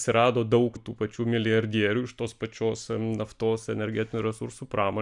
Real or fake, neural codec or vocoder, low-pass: real; none; 10.8 kHz